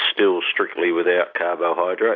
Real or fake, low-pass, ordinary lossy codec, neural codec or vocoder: real; 7.2 kHz; AAC, 48 kbps; none